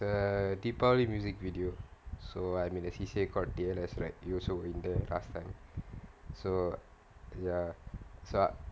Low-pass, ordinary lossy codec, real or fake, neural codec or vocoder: none; none; real; none